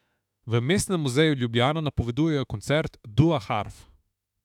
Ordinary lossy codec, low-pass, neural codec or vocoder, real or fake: none; 19.8 kHz; autoencoder, 48 kHz, 32 numbers a frame, DAC-VAE, trained on Japanese speech; fake